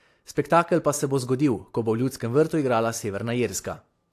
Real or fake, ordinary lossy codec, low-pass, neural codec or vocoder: real; AAC, 64 kbps; 14.4 kHz; none